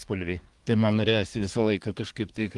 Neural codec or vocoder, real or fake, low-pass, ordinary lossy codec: codec, 24 kHz, 1 kbps, SNAC; fake; 10.8 kHz; Opus, 24 kbps